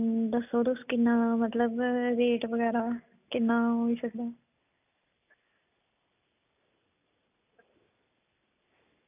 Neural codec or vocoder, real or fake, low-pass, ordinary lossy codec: none; real; 3.6 kHz; none